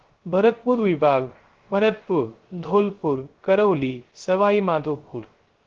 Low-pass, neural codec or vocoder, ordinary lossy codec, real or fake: 7.2 kHz; codec, 16 kHz, 0.3 kbps, FocalCodec; Opus, 16 kbps; fake